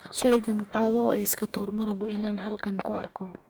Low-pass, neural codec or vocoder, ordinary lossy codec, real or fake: none; codec, 44.1 kHz, 2.6 kbps, DAC; none; fake